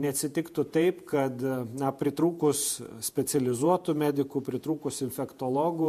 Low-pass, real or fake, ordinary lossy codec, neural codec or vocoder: 14.4 kHz; fake; MP3, 64 kbps; vocoder, 48 kHz, 128 mel bands, Vocos